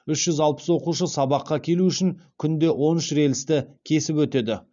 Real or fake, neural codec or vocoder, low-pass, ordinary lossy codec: real; none; 7.2 kHz; none